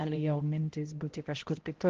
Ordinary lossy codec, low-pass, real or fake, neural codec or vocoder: Opus, 16 kbps; 7.2 kHz; fake; codec, 16 kHz, 0.5 kbps, X-Codec, HuBERT features, trained on balanced general audio